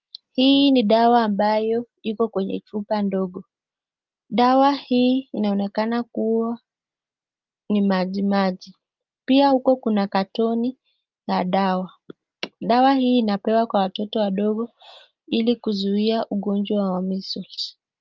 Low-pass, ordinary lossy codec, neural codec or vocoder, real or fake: 7.2 kHz; Opus, 24 kbps; none; real